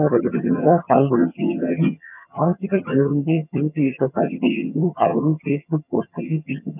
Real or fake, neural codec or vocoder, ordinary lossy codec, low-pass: fake; vocoder, 22.05 kHz, 80 mel bands, HiFi-GAN; none; 3.6 kHz